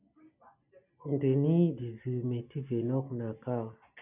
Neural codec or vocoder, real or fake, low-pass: none; real; 3.6 kHz